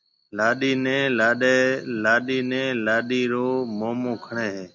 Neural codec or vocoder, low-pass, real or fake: none; 7.2 kHz; real